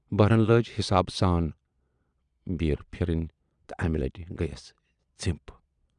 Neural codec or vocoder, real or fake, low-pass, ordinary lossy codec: vocoder, 22.05 kHz, 80 mel bands, Vocos; fake; 9.9 kHz; none